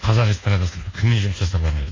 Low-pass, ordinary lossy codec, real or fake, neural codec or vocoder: 7.2 kHz; AAC, 32 kbps; fake; codec, 24 kHz, 1.2 kbps, DualCodec